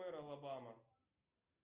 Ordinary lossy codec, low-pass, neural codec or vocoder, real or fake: Opus, 24 kbps; 3.6 kHz; none; real